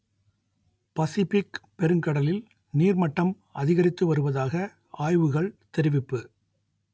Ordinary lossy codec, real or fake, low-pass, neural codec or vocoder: none; real; none; none